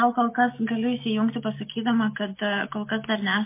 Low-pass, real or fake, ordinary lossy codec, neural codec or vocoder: 3.6 kHz; real; MP3, 24 kbps; none